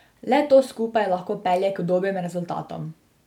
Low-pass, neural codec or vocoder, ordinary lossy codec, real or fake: 19.8 kHz; none; none; real